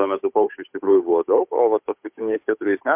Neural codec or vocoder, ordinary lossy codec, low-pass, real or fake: codec, 16 kHz, 8 kbps, FunCodec, trained on Chinese and English, 25 frames a second; MP3, 32 kbps; 3.6 kHz; fake